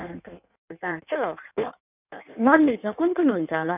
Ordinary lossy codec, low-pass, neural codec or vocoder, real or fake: none; 3.6 kHz; codec, 16 kHz in and 24 kHz out, 1.1 kbps, FireRedTTS-2 codec; fake